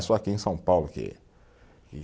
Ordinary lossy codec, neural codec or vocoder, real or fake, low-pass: none; none; real; none